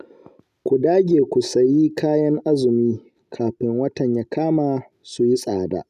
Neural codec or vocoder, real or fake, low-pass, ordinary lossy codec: none; real; 14.4 kHz; none